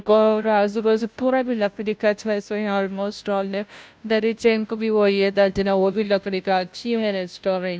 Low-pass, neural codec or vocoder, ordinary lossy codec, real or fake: none; codec, 16 kHz, 0.5 kbps, FunCodec, trained on Chinese and English, 25 frames a second; none; fake